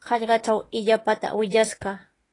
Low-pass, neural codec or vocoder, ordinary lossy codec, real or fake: 10.8 kHz; autoencoder, 48 kHz, 32 numbers a frame, DAC-VAE, trained on Japanese speech; AAC, 32 kbps; fake